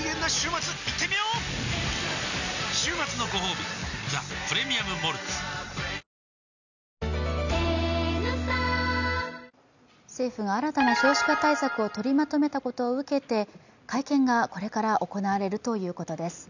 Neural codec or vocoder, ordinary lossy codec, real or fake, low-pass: none; none; real; 7.2 kHz